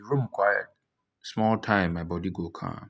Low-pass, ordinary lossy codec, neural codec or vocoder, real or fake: none; none; none; real